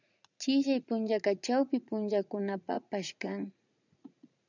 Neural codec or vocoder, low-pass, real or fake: vocoder, 44.1 kHz, 80 mel bands, Vocos; 7.2 kHz; fake